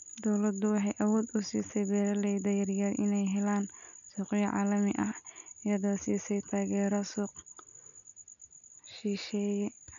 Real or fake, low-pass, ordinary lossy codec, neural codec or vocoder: real; 7.2 kHz; none; none